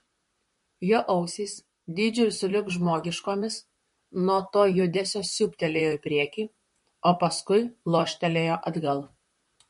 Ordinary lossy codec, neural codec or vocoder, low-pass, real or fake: MP3, 48 kbps; vocoder, 44.1 kHz, 128 mel bands, Pupu-Vocoder; 14.4 kHz; fake